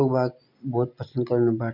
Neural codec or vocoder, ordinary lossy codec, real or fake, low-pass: none; none; real; 5.4 kHz